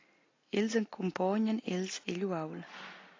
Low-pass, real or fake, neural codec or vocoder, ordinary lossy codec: 7.2 kHz; real; none; AAC, 32 kbps